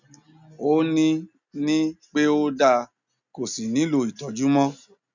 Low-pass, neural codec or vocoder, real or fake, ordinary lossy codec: 7.2 kHz; none; real; none